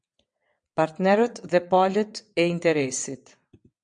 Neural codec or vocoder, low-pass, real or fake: vocoder, 22.05 kHz, 80 mel bands, WaveNeXt; 9.9 kHz; fake